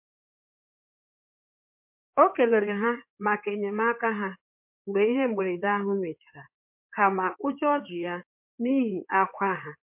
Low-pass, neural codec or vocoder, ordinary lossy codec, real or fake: 3.6 kHz; codec, 16 kHz in and 24 kHz out, 2.2 kbps, FireRedTTS-2 codec; MP3, 32 kbps; fake